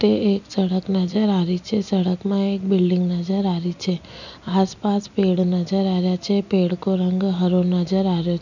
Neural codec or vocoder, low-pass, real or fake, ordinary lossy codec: none; 7.2 kHz; real; none